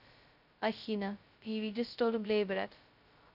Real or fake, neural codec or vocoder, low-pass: fake; codec, 16 kHz, 0.2 kbps, FocalCodec; 5.4 kHz